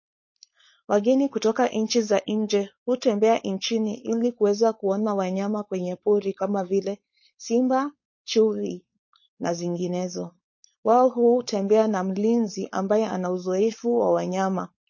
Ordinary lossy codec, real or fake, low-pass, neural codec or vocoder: MP3, 32 kbps; fake; 7.2 kHz; codec, 16 kHz, 4.8 kbps, FACodec